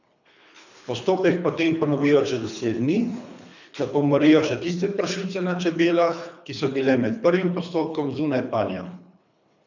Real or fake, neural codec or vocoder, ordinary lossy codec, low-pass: fake; codec, 24 kHz, 3 kbps, HILCodec; none; 7.2 kHz